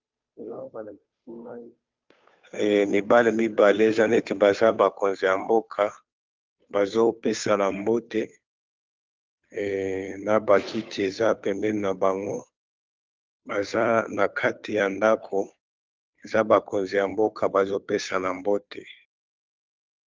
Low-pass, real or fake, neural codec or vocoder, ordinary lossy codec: 7.2 kHz; fake; codec, 16 kHz, 2 kbps, FunCodec, trained on Chinese and English, 25 frames a second; Opus, 24 kbps